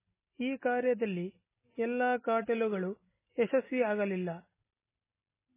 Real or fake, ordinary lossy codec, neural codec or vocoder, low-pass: real; MP3, 16 kbps; none; 3.6 kHz